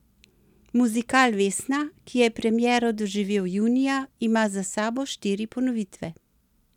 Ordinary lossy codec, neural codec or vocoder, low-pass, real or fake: none; none; 19.8 kHz; real